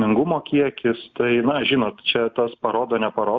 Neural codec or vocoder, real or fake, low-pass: none; real; 7.2 kHz